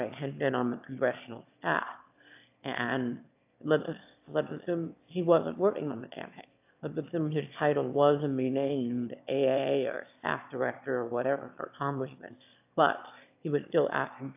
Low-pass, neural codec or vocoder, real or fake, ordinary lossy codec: 3.6 kHz; autoencoder, 22.05 kHz, a latent of 192 numbers a frame, VITS, trained on one speaker; fake; AAC, 32 kbps